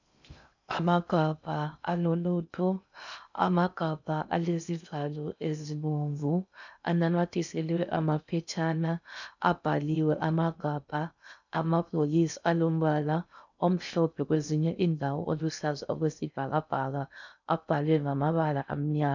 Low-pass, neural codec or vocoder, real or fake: 7.2 kHz; codec, 16 kHz in and 24 kHz out, 0.6 kbps, FocalCodec, streaming, 2048 codes; fake